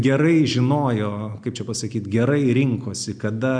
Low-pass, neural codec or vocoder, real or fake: 9.9 kHz; none; real